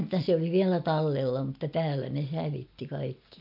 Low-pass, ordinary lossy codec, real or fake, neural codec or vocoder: 5.4 kHz; AAC, 48 kbps; real; none